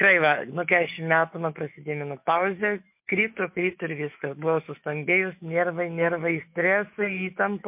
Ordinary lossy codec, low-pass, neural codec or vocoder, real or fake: MP3, 32 kbps; 3.6 kHz; autoencoder, 48 kHz, 128 numbers a frame, DAC-VAE, trained on Japanese speech; fake